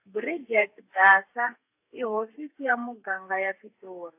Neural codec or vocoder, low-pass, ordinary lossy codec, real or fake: codec, 32 kHz, 1.9 kbps, SNAC; 3.6 kHz; AAC, 32 kbps; fake